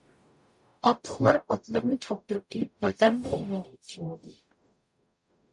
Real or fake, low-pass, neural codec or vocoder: fake; 10.8 kHz; codec, 44.1 kHz, 0.9 kbps, DAC